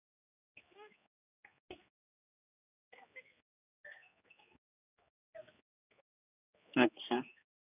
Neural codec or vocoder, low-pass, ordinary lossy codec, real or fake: codec, 16 kHz, 2 kbps, X-Codec, HuBERT features, trained on general audio; 3.6 kHz; none; fake